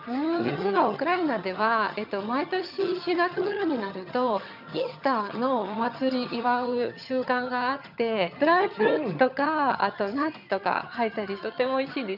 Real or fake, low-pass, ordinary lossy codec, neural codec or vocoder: fake; 5.4 kHz; none; vocoder, 22.05 kHz, 80 mel bands, HiFi-GAN